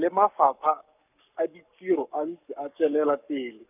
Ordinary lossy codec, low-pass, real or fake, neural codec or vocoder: none; 3.6 kHz; fake; codec, 44.1 kHz, 7.8 kbps, Pupu-Codec